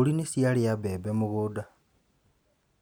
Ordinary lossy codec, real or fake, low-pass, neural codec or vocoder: none; real; none; none